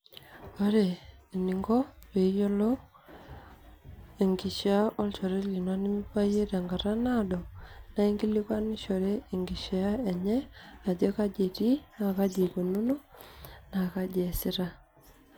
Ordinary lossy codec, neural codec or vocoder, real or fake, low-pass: none; none; real; none